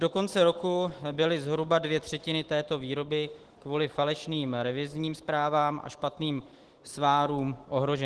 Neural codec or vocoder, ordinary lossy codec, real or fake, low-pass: autoencoder, 48 kHz, 128 numbers a frame, DAC-VAE, trained on Japanese speech; Opus, 16 kbps; fake; 10.8 kHz